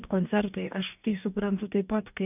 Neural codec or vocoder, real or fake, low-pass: codec, 44.1 kHz, 2.6 kbps, DAC; fake; 3.6 kHz